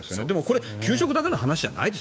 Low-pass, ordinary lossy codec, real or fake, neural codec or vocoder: none; none; fake; codec, 16 kHz, 6 kbps, DAC